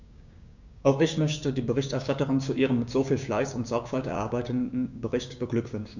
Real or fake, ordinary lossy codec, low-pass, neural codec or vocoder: fake; none; 7.2 kHz; codec, 16 kHz, 6 kbps, DAC